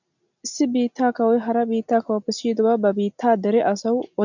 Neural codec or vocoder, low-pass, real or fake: none; 7.2 kHz; real